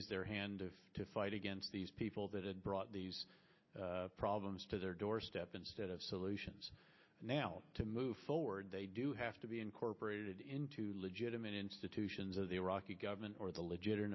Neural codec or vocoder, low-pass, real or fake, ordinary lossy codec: none; 7.2 kHz; real; MP3, 24 kbps